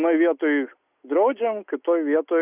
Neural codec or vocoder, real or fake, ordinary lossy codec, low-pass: none; real; Opus, 64 kbps; 3.6 kHz